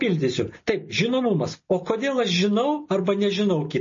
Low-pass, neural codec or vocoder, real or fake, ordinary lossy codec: 7.2 kHz; none; real; MP3, 32 kbps